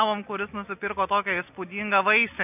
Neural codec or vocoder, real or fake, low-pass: none; real; 3.6 kHz